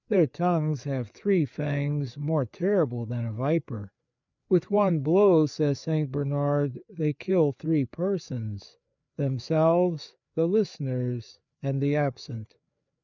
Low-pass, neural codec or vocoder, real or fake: 7.2 kHz; codec, 16 kHz, 4 kbps, FreqCodec, larger model; fake